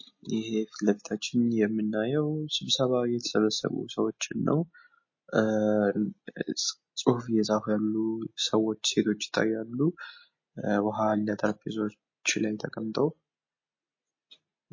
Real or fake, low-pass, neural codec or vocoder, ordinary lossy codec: real; 7.2 kHz; none; MP3, 32 kbps